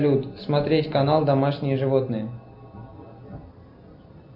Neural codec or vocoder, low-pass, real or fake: none; 5.4 kHz; real